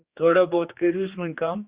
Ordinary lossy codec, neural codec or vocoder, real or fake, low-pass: Opus, 64 kbps; codec, 16 kHz, 2 kbps, X-Codec, HuBERT features, trained on general audio; fake; 3.6 kHz